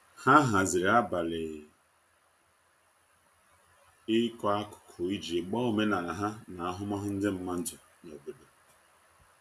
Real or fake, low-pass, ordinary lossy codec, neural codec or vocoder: real; 14.4 kHz; none; none